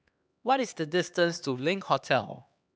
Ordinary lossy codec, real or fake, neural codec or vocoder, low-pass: none; fake; codec, 16 kHz, 2 kbps, X-Codec, HuBERT features, trained on LibriSpeech; none